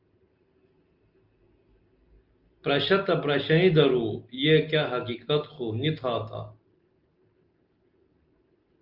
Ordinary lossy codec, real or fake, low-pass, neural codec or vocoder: Opus, 32 kbps; real; 5.4 kHz; none